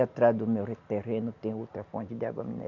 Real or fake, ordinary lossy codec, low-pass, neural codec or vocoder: real; none; 7.2 kHz; none